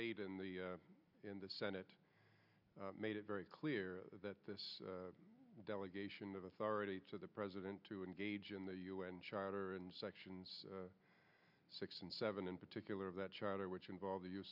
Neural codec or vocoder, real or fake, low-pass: none; real; 5.4 kHz